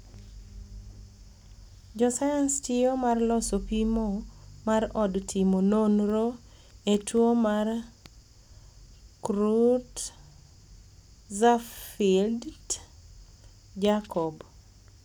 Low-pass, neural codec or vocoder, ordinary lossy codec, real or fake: none; none; none; real